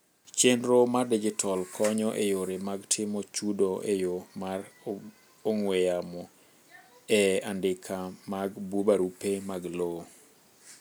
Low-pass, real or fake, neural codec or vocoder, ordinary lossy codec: none; real; none; none